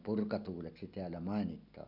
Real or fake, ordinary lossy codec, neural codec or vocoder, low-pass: real; none; none; 5.4 kHz